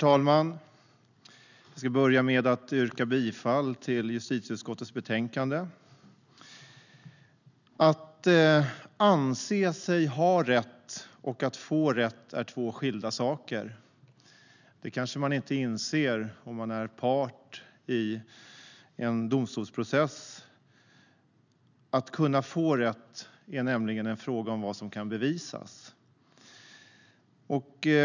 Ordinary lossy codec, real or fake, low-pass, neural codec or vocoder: none; real; 7.2 kHz; none